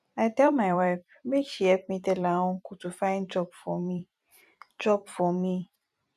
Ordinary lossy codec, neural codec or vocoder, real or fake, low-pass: none; vocoder, 44.1 kHz, 128 mel bands every 256 samples, BigVGAN v2; fake; 14.4 kHz